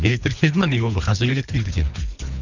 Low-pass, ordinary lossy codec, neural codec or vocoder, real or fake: 7.2 kHz; none; codec, 24 kHz, 3 kbps, HILCodec; fake